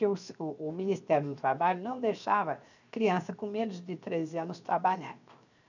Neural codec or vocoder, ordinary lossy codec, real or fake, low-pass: codec, 16 kHz, 0.7 kbps, FocalCodec; none; fake; 7.2 kHz